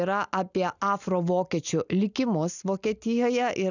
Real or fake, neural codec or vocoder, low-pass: real; none; 7.2 kHz